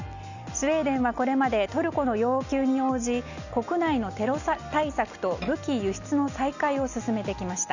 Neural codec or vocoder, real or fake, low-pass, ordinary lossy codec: none; real; 7.2 kHz; none